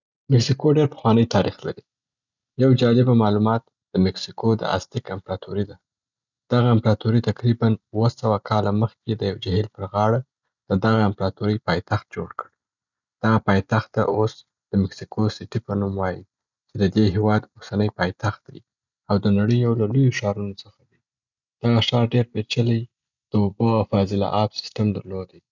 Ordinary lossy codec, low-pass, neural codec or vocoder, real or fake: none; 7.2 kHz; none; real